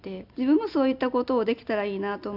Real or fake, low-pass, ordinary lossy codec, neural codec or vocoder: real; 5.4 kHz; none; none